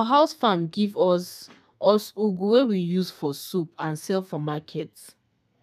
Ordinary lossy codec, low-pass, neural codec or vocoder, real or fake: none; 14.4 kHz; codec, 32 kHz, 1.9 kbps, SNAC; fake